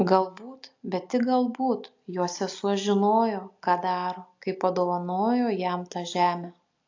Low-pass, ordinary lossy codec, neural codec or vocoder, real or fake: 7.2 kHz; AAC, 48 kbps; none; real